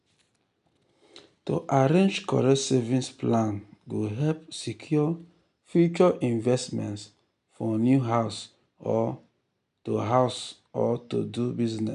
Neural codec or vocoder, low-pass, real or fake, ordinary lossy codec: none; 10.8 kHz; real; none